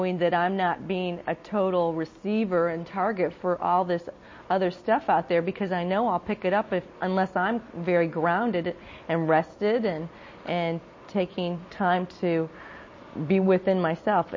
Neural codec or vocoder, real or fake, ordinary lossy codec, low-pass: none; real; MP3, 32 kbps; 7.2 kHz